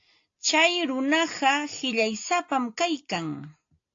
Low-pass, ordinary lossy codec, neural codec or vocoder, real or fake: 7.2 kHz; AAC, 48 kbps; none; real